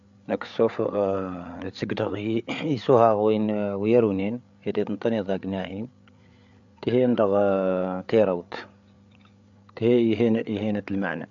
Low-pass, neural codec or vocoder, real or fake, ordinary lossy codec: 7.2 kHz; codec, 16 kHz, 8 kbps, FreqCodec, larger model; fake; MP3, 64 kbps